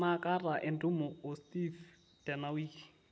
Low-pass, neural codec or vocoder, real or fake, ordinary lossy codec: none; none; real; none